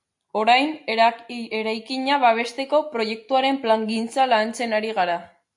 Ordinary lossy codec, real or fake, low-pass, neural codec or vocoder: AAC, 64 kbps; real; 10.8 kHz; none